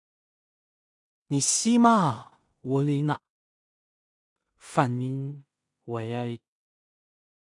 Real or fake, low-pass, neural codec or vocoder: fake; 10.8 kHz; codec, 16 kHz in and 24 kHz out, 0.4 kbps, LongCat-Audio-Codec, two codebook decoder